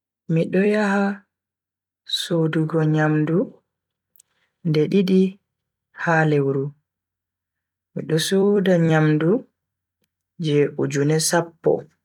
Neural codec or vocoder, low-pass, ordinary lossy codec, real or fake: vocoder, 44.1 kHz, 128 mel bands every 256 samples, BigVGAN v2; 19.8 kHz; none; fake